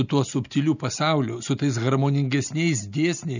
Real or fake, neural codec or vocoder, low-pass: real; none; 7.2 kHz